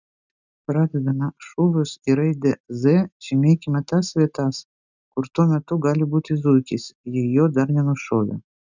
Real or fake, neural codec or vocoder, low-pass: real; none; 7.2 kHz